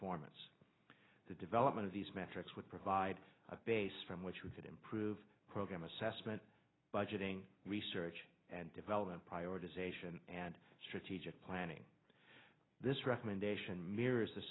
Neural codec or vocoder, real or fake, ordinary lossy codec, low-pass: none; real; AAC, 16 kbps; 7.2 kHz